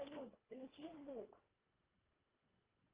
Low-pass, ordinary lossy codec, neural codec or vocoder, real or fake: 3.6 kHz; Opus, 32 kbps; codec, 24 kHz, 1.5 kbps, HILCodec; fake